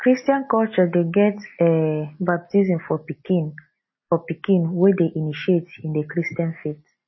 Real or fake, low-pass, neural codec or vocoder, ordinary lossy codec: real; 7.2 kHz; none; MP3, 24 kbps